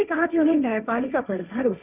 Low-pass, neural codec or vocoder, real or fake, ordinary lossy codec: 3.6 kHz; codec, 16 kHz, 1.1 kbps, Voila-Tokenizer; fake; none